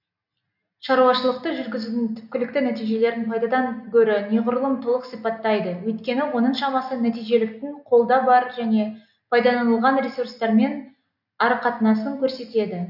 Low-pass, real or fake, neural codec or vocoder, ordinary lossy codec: 5.4 kHz; real; none; none